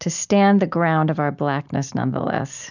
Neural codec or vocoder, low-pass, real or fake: none; 7.2 kHz; real